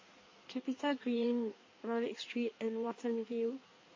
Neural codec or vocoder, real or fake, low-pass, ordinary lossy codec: codec, 16 kHz in and 24 kHz out, 1.1 kbps, FireRedTTS-2 codec; fake; 7.2 kHz; MP3, 32 kbps